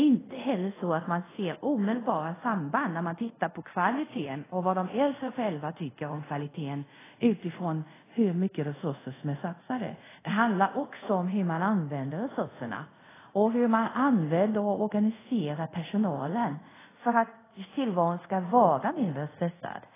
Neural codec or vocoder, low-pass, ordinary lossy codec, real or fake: codec, 24 kHz, 0.5 kbps, DualCodec; 3.6 kHz; AAC, 16 kbps; fake